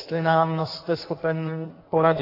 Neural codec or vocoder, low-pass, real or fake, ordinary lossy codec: codec, 16 kHz in and 24 kHz out, 1.1 kbps, FireRedTTS-2 codec; 5.4 kHz; fake; AAC, 32 kbps